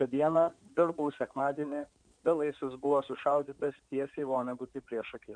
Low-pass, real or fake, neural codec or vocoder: 9.9 kHz; fake; codec, 16 kHz in and 24 kHz out, 2.2 kbps, FireRedTTS-2 codec